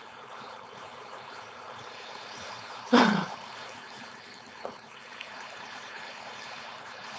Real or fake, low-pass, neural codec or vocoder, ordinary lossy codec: fake; none; codec, 16 kHz, 4.8 kbps, FACodec; none